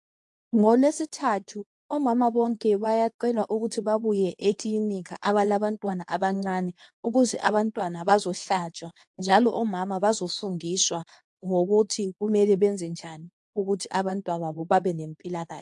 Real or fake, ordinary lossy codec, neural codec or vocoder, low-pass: fake; AAC, 64 kbps; codec, 24 kHz, 0.9 kbps, WavTokenizer, medium speech release version 2; 10.8 kHz